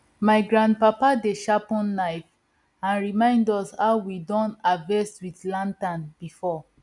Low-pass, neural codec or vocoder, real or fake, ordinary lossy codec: 10.8 kHz; none; real; none